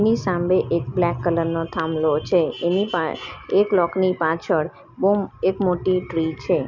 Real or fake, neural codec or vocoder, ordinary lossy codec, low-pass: real; none; none; 7.2 kHz